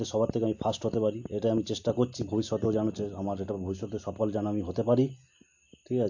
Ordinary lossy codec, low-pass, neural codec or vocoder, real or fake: AAC, 48 kbps; 7.2 kHz; none; real